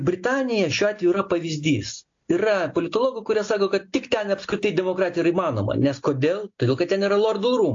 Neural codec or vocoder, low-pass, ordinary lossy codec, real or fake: none; 7.2 kHz; AAC, 48 kbps; real